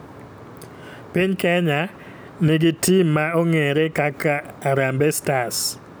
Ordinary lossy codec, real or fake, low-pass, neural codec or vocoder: none; real; none; none